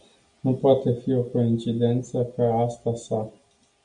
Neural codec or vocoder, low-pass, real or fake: none; 9.9 kHz; real